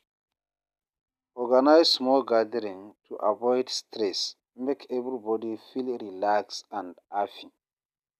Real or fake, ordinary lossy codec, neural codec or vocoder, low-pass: real; none; none; 14.4 kHz